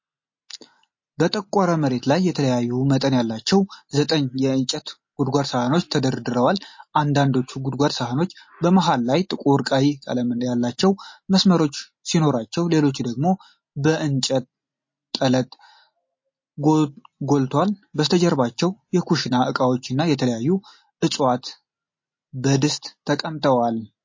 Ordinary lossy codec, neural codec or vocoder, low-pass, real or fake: MP3, 32 kbps; none; 7.2 kHz; real